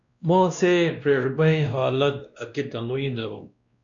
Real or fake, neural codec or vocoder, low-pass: fake; codec, 16 kHz, 1 kbps, X-Codec, WavLM features, trained on Multilingual LibriSpeech; 7.2 kHz